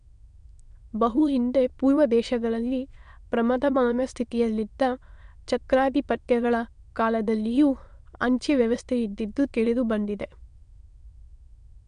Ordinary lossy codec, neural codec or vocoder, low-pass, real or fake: MP3, 64 kbps; autoencoder, 22.05 kHz, a latent of 192 numbers a frame, VITS, trained on many speakers; 9.9 kHz; fake